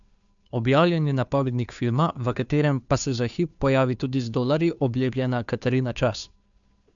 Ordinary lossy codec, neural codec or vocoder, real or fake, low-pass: none; codec, 16 kHz, 2 kbps, FunCodec, trained on Chinese and English, 25 frames a second; fake; 7.2 kHz